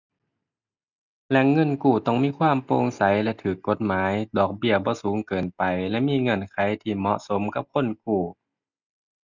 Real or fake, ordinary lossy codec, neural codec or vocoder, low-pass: real; none; none; 7.2 kHz